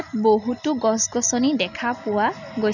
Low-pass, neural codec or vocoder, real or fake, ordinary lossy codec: 7.2 kHz; none; real; none